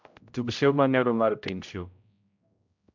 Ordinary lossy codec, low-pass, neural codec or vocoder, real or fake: MP3, 64 kbps; 7.2 kHz; codec, 16 kHz, 0.5 kbps, X-Codec, HuBERT features, trained on general audio; fake